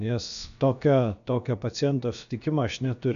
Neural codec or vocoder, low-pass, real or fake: codec, 16 kHz, about 1 kbps, DyCAST, with the encoder's durations; 7.2 kHz; fake